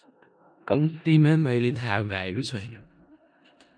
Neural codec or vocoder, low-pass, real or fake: codec, 16 kHz in and 24 kHz out, 0.4 kbps, LongCat-Audio-Codec, four codebook decoder; 9.9 kHz; fake